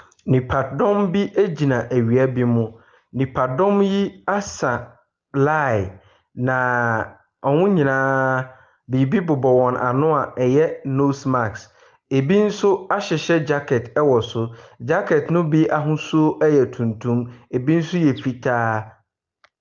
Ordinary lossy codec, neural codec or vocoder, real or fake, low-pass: Opus, 24 kbps; none; real; 7.2 kHz